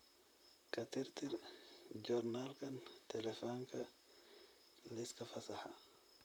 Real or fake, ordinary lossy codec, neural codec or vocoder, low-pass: fake; none; vocoder, 44.1 kHz, 128 mel bands, Pupu-Vocoder; none